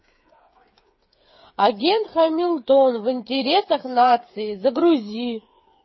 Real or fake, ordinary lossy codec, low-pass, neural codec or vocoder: fake; MP3, 24 kbps; 7.2 kHz; codec, 16 kHz, 8 kbps, FreqCodec, smaller model